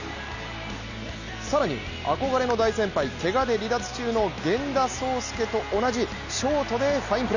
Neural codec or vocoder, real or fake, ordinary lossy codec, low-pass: none; real; none; 7.2 kHz